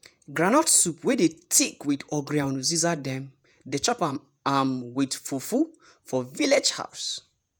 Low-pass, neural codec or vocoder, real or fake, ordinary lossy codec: none; vocoder, 48 kHz, 128 mel bands, Vocos; fake; none